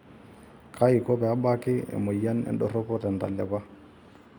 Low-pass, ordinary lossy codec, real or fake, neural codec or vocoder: 19.8 kHz; none; real; none